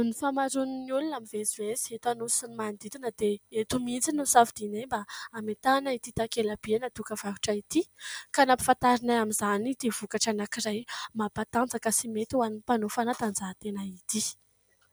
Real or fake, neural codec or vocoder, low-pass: real; none; 19.8 kHz